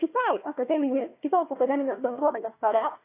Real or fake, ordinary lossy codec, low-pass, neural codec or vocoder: fake; AAC, 24 kbps; 3.6 kHz; codec, 16 kHz, 1 kbps, FunCodec, trained on Chinese and English, 50 frames a second